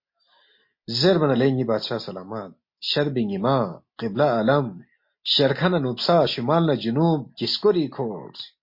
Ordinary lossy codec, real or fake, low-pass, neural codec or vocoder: MP3, 32 kbps; real; 5.4 kHz; none